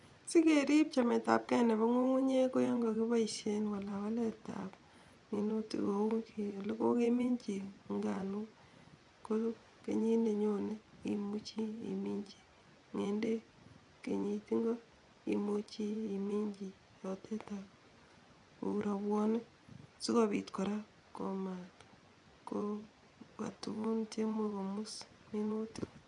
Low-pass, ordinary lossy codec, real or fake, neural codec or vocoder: 10.8 kHz; none; fake; vocoder, 24 kHz, 100 mel bands, Vocos